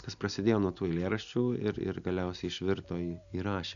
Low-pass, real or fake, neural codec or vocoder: 7.2 kHz; real; none